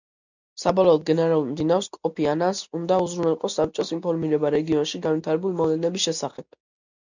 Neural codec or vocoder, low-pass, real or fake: none; 7.2 kHz; real